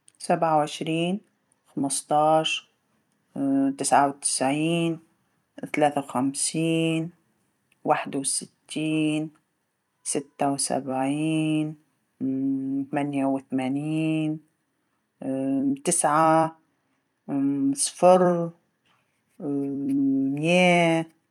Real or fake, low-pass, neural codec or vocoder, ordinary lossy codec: fake; 19.8 kHz; vocoder, 44.1 kHz, 128 mel bands every 256 samples, BigVGAN v2; none